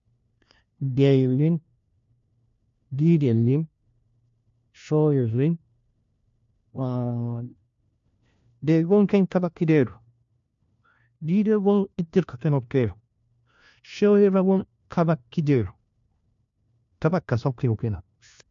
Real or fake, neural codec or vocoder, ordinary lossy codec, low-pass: fake; codec, 16 kHz, 1 kbps, FunCodec, trained on LibriTTS, 50 frames a second; MP3, 64 kbps; 7.2 kHz